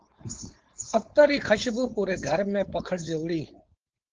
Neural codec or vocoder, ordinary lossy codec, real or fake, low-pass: codec, 16 kHz, 4.8 kbps, FACodec; Opus, 16 kbps; fake; 7.2 kHz